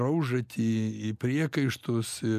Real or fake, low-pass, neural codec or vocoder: real; 14.4 kHz; none